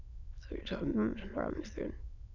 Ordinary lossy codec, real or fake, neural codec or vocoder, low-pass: none; fake; autoencoder, 22.05 kHz, a latent of 192 numbers a frame, VITS, trained on many speakers; 7.2 kHz